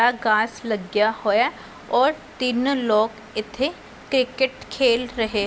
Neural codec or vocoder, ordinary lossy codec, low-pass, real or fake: none; none; none; real